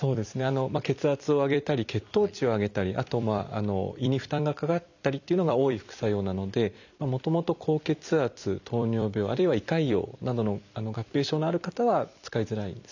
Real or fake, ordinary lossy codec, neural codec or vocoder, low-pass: fake; none; vocoder, 44.1 kHz, 128 mel bands every 256 samples, BigVGAN v2; 7.2 kHz